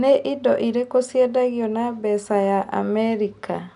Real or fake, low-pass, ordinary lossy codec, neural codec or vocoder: real; 10.8 kHz; none; none